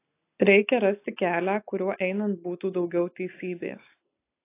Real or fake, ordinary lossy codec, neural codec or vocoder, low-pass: real; AAC, 24 kbps; none; 3.6 kHz